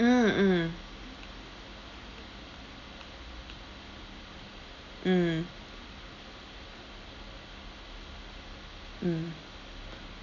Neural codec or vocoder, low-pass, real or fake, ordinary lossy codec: none; 7.2 kHz; real; none